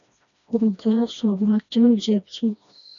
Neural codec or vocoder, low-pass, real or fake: codec, 16 kHz, 1 kbps, FreqCodec, smaller model; 7.2 kHz; fake